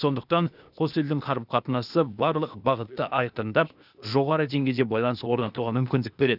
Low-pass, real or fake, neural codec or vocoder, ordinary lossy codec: 5.4 kHz; fake; codec, 16 kHz, 0.8 kbps, ZipCodec; none